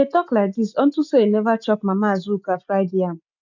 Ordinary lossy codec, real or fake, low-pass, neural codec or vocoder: none; real; 7.2 kHz; none